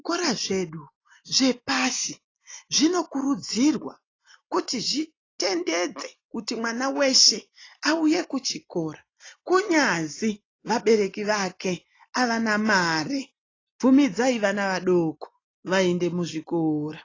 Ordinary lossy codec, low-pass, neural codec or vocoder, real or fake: AAC, 32 kbps; 7.2 kHz; none; real